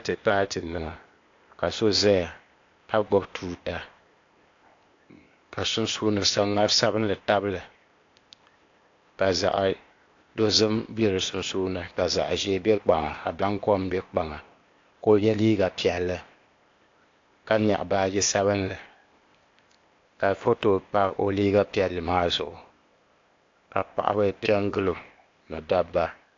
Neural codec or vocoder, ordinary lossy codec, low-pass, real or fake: codec, 16 kHz, 0.8 kbps, ZipCodec; AAC, 48 kbps; 7.2 kHz; fake